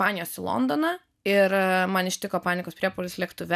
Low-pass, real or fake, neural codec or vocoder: 14.4 kHz; real; none